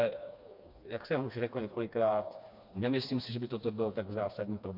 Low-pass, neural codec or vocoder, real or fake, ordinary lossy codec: 5.4 kHz; codec, 16 kHz, 2 kbps, FreqCodec, smaller model; fake; MP3, 48 kbps